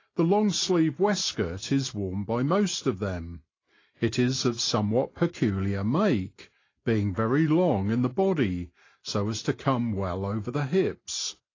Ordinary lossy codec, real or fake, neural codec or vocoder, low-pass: AAC, 32 kbps; real; none; 7.2 kHz